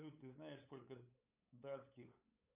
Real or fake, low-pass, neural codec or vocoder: fake; 3.6 kHz; codec, 16 kHz, 8 kbps, FunCodec, trained on LibriTTS, 25 frames a second